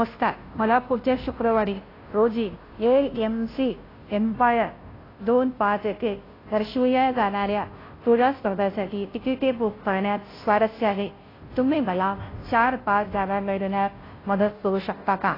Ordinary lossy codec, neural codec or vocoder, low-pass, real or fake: AAC, 24 kbps; codec, 16 kHz, 0.5 kbps, FunCodec, trained on Chinese and English, 25 frames a second; 5.4 kHz; fake